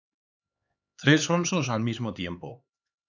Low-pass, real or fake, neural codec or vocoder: 7.2 kHz; fake; codec, 16 kHz, 4 kbps, X-Codec, HuBERT features, trained on LibriSpeech